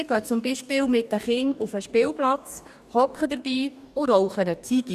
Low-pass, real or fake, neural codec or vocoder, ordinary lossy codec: 14.4 kHz; fake; codec, 44.1 kHz, 2.6 kbps, DAC; none